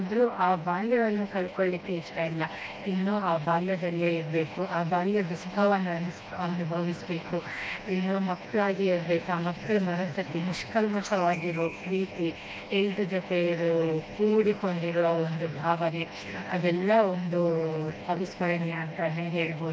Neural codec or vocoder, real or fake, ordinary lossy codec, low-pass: codec, 16 kHz, 1 kbps, FreqCodec, smaller model; fake; none; none